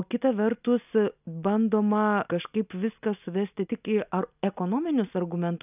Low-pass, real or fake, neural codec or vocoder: 3.6 kHz; real; none